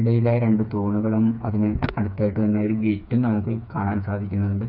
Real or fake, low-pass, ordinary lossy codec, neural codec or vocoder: fake; 5.4 kHz; none; codec, 16 kHz, 4 kbps, FreqCodec, smaller model